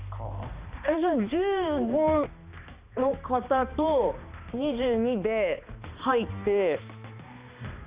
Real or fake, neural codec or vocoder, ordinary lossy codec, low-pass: fake; codec, 16 kHz, 2 kbps, X-Codec, HuBERT features, trained on balanced general audio; Opus, 64 kbps; 3.6 kHz